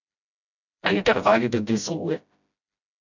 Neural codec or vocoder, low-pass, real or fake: codec, 16 kHz, 0.5 kbps, FreqCodec, smaller model; 7.2 kHz; fake